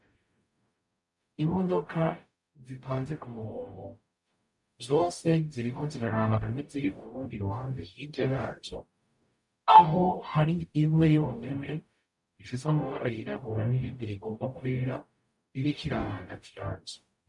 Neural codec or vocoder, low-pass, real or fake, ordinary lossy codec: codec, 44.1 kHz, 0.9 kbps, DAC; 10.8 kHz; fake; MP3, 64 kbps